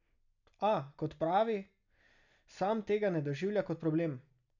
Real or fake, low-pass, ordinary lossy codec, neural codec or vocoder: real; 7.2 kHz; none; none